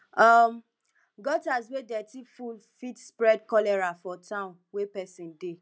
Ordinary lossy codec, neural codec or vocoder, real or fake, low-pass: none; none; real; none